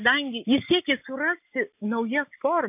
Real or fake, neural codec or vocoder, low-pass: real; none; 3.6 kHz